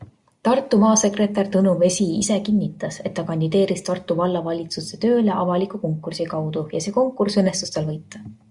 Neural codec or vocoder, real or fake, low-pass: none; real; 10.8 kHz